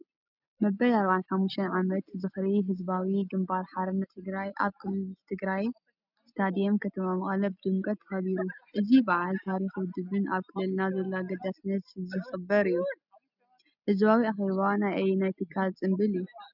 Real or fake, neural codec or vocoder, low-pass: real; none; 5.4 kHz